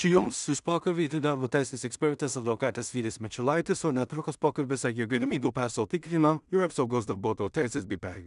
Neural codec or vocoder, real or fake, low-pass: codec, 16 kHz in and 24 kHz out, 0.4 kbps, LongCat-Audio-Codec, two codebook decoder; fake; 10.8 kHz